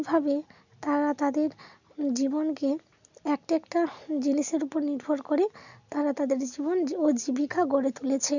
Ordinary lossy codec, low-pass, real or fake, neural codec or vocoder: none; 7.2 kHz; real; none